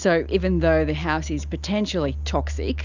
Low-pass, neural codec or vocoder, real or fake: 7.2 kHz; none; real